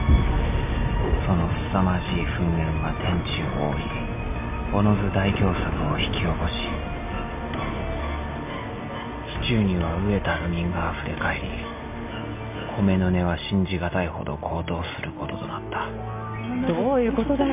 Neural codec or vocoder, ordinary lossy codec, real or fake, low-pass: none; MP3, 32 kbps; real; 3.6 kHz